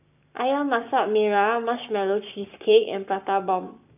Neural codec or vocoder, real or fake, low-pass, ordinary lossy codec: codec, 44.1 kHz, 7.8 kbps, Pupu-Codec; fake; 3.6 kHz; none